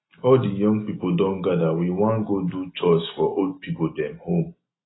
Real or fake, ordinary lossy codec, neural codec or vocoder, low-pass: real; AAC, 16 kbps; none; 7.2 kHz